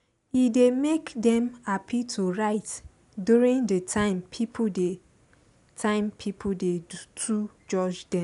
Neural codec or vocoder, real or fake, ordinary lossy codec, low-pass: none; real; none; 10.8 kHz